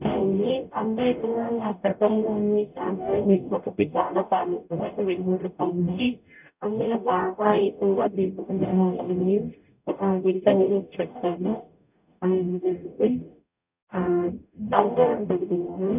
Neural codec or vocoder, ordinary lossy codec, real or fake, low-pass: codec, 44.1 kHz, 0.9 kbps, DAC; none; fake; 3.6 kHz